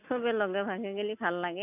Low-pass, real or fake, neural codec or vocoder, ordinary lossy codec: 3.6 kHz; real; none; none